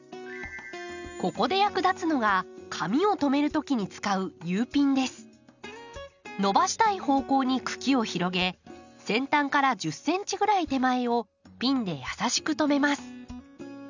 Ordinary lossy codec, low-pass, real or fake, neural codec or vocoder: none; 7.2 kHz; real; none